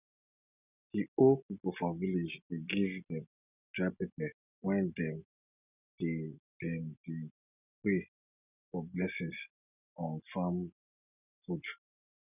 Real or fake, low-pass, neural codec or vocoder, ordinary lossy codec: real; 3.6 kHz; none; none